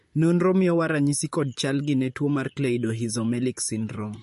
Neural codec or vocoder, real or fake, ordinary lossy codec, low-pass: vocoder, 44.1 kHz, 128 mel bands, Pupu-Vocoder; fake; MP3, 48 kbps; 14.4 kHz